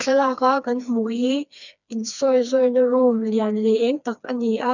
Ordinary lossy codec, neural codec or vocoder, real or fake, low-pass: none; codec, 16 kHz, 2 kbps, FreqCodec, smaller model; fake; 7.2 kHz